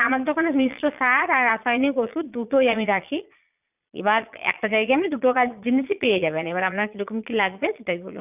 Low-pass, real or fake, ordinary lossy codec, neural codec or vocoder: 3.6 kHz; fake; none; vocoder, 22.05 kHz, 80 mel bands, Vocos